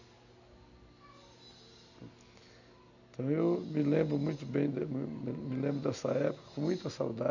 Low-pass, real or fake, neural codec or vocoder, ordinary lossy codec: 7.2 kHz; real; none; none